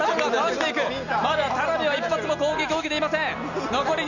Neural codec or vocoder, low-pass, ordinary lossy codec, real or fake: none; 7.2 kHz; none; real